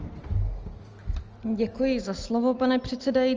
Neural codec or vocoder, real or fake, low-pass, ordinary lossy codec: none; real; 7.2 kHz; Opus, 24 kbps